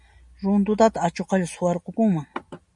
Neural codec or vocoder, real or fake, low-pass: none; real; 10.8 kHz